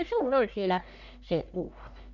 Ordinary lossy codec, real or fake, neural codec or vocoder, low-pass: none; fake; codec, 44.1 kHz, 1.7 kbps, Pupu-Codec; 7.2 kHz